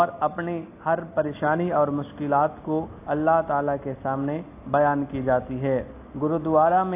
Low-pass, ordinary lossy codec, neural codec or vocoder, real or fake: 3.6 kHz; AAC, 24 kbps; none; real